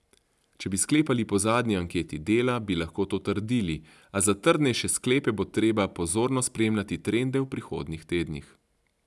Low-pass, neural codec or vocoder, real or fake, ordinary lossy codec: none; none; real; none